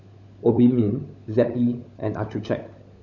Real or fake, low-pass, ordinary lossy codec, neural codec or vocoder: fake; 7.2 kHz; none; codec, 16 kHz, 16 kbps, FunCodec, trained on LibriTTS, 50 frames a second